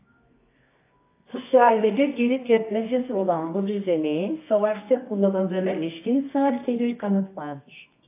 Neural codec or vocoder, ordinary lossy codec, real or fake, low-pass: codec, 24 kHz, 0.9 kbps, WavTokenizer, medium music audio release; AAC, 24 kbps; fake; 3.6 kHz